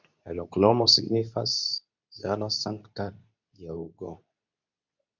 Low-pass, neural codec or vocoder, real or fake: 7.2 kHz; codec, 24 kHz, 0.9 kbps, WavTokenizer, medium speech release version 2; fake